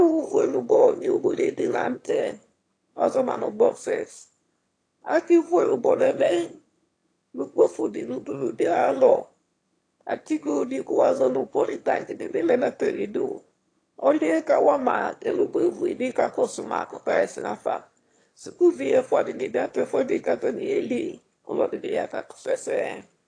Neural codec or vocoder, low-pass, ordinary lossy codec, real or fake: autoencoder, 22.05 kHz, a latent of 192 numbers a frame, VITS, trained on one speaker; 9.9 kHz; AAC, 48 kbps; fake